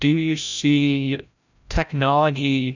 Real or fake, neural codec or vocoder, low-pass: fake; codec, 16 kHz, 0.5 kbps, FreqCodec, larger model; 7.2 kHz